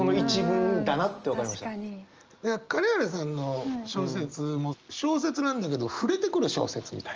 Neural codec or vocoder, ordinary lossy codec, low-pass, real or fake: none; Opus, 24 kbps; 7.2 kHz; real